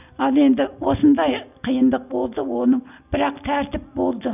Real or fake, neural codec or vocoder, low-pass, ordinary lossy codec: real; none; 3.6 kHz; none